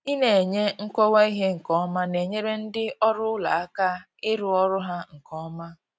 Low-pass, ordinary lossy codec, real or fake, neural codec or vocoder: none; none; real; none